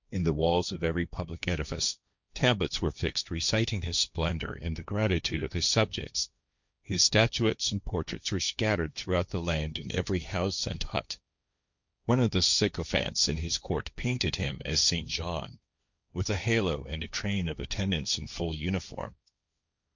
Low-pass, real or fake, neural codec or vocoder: 7.2 kHz; fake; codec, 16 kHz, 1.1 kbps, Voila-Tokenizer